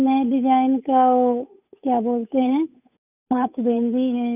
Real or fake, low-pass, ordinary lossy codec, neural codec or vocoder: fake; 3.6 kHz; none; codec, 16 kHz, 8 kbps, FunCodec, trained on Chinese and English, 25 frames a second